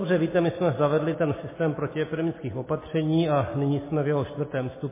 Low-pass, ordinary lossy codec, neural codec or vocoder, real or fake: 3.6 kHz; MP3, 16 kbps; none; real